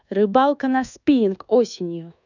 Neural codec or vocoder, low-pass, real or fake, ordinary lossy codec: codec, 24 kHz, 1.2 kbps, DualCodec; 7.2 kHz; fake; none